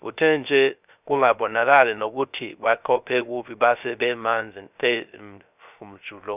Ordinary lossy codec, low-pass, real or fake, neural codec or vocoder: none; 3.6 kHz; fake; codec, 16 kHz, 0.3 kbps, FocalCodec